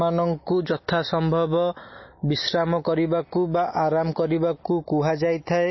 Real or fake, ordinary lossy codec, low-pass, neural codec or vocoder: real; MP3, 24 kbps; 7.2 kHz; none